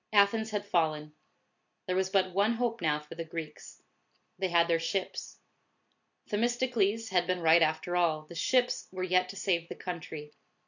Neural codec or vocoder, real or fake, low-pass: none; real; 7.2 kHz